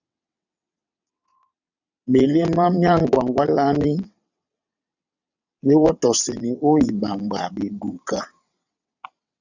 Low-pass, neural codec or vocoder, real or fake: 7.2 kHz; vocoder, 22.05 kHz, 80 mel bands, WaveNeXt; fake